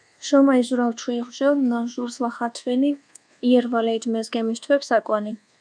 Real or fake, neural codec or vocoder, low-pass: fake; codec, 24 kHz, 1.2 kbps, DualCodec; 9.9 kHz